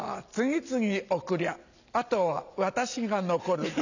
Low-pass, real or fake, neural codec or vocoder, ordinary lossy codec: 7.2 kHz; real; none; none